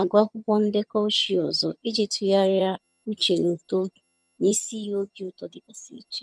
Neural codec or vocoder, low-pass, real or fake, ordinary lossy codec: vocoder, 22.05 kHz, 80 mel bands, HiFi-GAN; none; fake; none